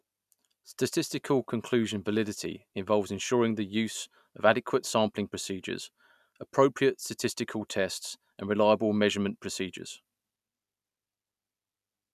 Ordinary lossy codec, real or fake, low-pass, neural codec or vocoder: none; real; 14.4 kHz; none